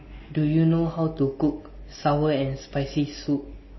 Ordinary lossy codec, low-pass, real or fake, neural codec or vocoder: MP3, 24 kbps; 7.2 kHz; real; none